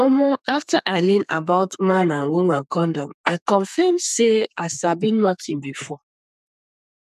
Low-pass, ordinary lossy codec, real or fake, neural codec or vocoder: 14.4 kHz; none; fake; codec, 32 kHz, 1.9 kbps, SNAC